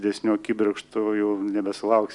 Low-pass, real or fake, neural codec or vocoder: 10.8 kHz; real; none